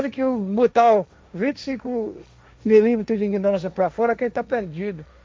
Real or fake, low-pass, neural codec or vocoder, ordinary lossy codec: fake; none; codec, 16 kHz, 1.1 kbps, Voila-Tokenizer; none